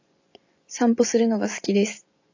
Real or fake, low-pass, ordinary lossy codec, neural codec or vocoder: fake; 7.2 kHz; AAC, 48 kbps; vocoder, 24 kHz, 100 mel bands, Vocos